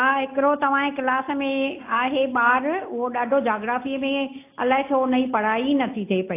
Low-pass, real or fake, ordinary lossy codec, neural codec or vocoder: 3.6 kHz; real; AAC, 24 kbps; none